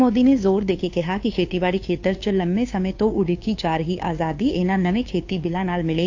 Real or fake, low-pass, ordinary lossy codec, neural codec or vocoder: fake; 7.2 kHz; AAC, 48 kbps; codec, 16 kHz, 2 kbps, FunCodec, trained on Chinese and English, 25 frames a second